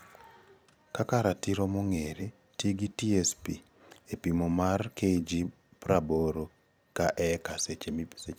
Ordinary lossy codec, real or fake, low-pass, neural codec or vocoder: none; fake; none; vocoder, 44.1 kHz, 128 mel bands every 256 samples, BigVGAN v2